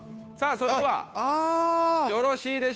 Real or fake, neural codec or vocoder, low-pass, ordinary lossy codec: fake; codec, 16 kHz, 2 kbps, FunCodec, trained on Chinese and English, 25 frames a second; none; none